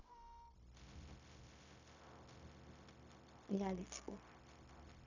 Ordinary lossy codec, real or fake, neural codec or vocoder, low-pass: none; fake; codec, 16 kHz, 0.4 kbps, LongCat-Audio-Codec; 7.2 kHz